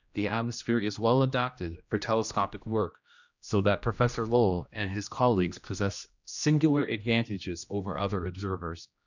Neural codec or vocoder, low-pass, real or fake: codec, 16 kHz, 1 kbps, X-Codec, HuBERT features, trained on general audio; 7.2 kHz; fake